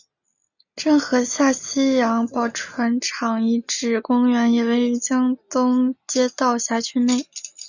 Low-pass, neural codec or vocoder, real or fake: 7.2 kHz; none; real